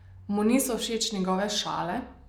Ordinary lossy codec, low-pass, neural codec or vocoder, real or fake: none; 19.8 kHz; none; real